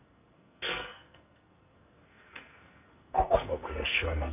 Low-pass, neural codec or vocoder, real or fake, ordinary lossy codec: 3.6 kHz; codec, 44.1 kHz, 2.6 kbps, SNAC; fake; none